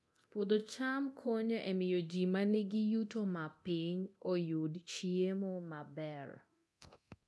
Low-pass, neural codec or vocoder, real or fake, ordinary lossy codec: none; codec, 24 kHz, 0.9 kbps, DualCodec; fake; none